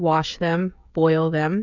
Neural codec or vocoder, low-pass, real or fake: codec, 16 kHz, 16 kbps, FreqCodec, smaller model; 7.2 kHz; fake